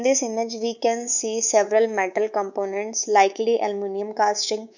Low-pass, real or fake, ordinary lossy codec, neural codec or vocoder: 7.2 kHz; fake; none; codec, 24 kHz, 3.1 kbps, DualCodec